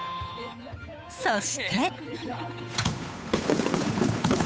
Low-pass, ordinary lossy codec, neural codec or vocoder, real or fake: none; none; codec, 16 kHz, 8 kbps, FunCodec, trained on Chinese and English, 25 frames a second; fake